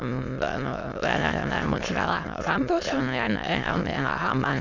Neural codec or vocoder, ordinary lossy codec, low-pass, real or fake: autoencoder, 22.05 kHz, a latent of 192 numbers a frame, VITS, trained on many speakers; none; 7.2 kHz; fake